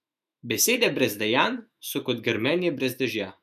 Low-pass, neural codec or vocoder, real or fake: 14.4 kHz; autoencoder, 48 kHz, 128 numbers a frame, DAC-VAE, trained on Japanese speech; fake